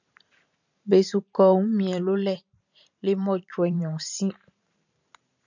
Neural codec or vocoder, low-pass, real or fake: vocoder, 44.1 kHz, 128 mel bands every 512 samples, BigVGAN v2; 7.2 kHz; fake